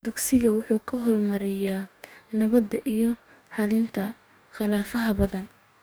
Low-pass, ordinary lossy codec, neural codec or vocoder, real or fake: none; none; codec, 44.1 kHz, 2.6 kbps, DAC; fake